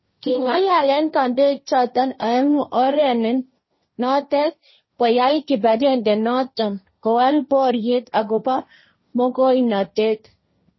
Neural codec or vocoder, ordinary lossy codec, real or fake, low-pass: codec, 16 kHz, 1.1 kbps, Voila-Tokenizer; MP3, 24 kbps; fake; 7.2 kHz